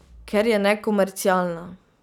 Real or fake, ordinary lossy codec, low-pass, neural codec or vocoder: real; none; 19.8 kHz; none